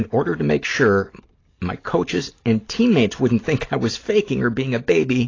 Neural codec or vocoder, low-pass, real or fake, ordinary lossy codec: none; 7.2 kHz; real; AAC, 32 kbps